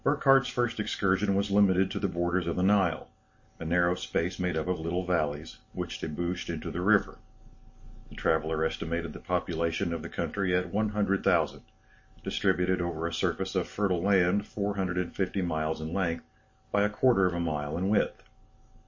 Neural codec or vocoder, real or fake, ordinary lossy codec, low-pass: none; real; MP3, 32 kbps; 7.2 kHz